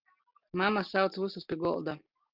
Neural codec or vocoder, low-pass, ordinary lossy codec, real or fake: none; 5.4 kHz; Opus, 24 kbps; real